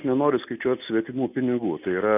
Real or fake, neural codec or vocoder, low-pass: real; none; 3.6 kHz